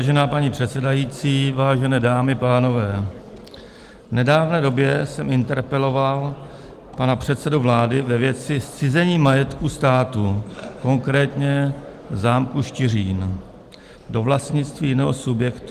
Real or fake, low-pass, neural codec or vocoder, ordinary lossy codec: real; 14.4 kHz; none; Opus, 24 kbps